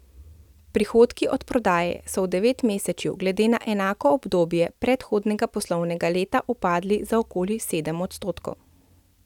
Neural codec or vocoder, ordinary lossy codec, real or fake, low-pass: none; none; real; 19.8 kHz